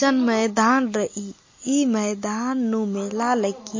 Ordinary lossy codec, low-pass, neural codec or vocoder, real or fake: MP3, 32 kbps; 7.2 kHz; none; real